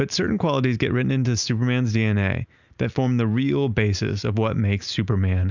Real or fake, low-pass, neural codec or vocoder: real; 7.2 kHz; none